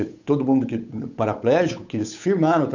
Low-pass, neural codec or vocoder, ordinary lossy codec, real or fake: 7.2 kHz; codec, 16 kHz, 8 kbps, FunCodec, trained on Chinese and English, 25 frames a second; none; fake